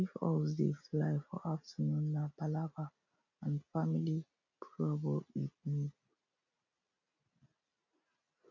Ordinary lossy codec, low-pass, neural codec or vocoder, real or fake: none; 7.2 kHz; none; real